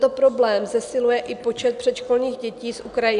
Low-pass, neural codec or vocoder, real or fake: 10.8 kHz; none; real